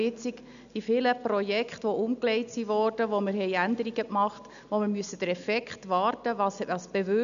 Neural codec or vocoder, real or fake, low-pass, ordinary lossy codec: none; real; 7.2 kHz; none